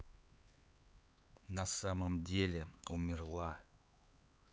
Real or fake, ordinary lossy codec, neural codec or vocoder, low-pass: fake; none; codec, 16 kHz, 4 kbps, X-Codec, HuBERT features, trained on LibriSpeech; none